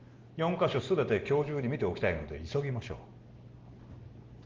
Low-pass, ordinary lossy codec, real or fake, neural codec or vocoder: 7.2 kHz; Opus, 16 kbps; real; none